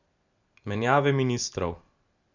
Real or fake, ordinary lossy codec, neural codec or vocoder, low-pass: real; none; none; 7.2 kHz